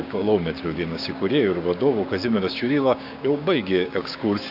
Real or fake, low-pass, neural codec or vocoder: fake; 5.4 kHz; codec, 16 kHz, 6 kbps, DAC